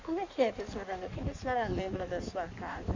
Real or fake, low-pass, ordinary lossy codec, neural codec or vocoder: fake; 7.2 kHz; Opus, 64 kbps; codec, 16 kHz in and 24 kHz out, 1.1 kbps, FireRedTTS-2 codec